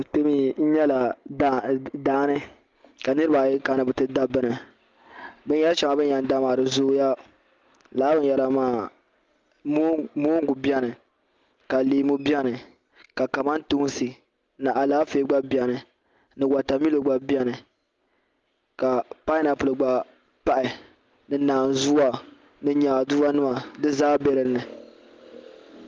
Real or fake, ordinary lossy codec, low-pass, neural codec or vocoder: real; Opus, 32 kbps; 7.2 kHz; none